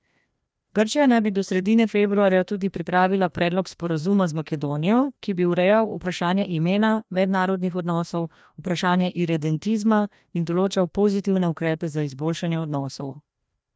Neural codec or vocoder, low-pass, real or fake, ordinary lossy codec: codec, 16 kHz, 1 kbps, FreqCodec, larger model; none; fake; none